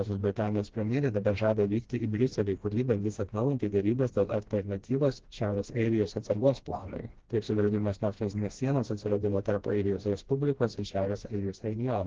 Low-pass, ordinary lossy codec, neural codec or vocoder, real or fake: 7.2 kHz; Opus, 16 kbps; codec, 16 kHz, 1 kbps, FreqCodec, smaller model; fake